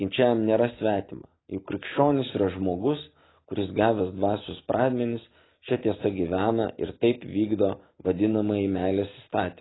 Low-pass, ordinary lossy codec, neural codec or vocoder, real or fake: 7.2 kHz; AAC, 16 kbps; none; real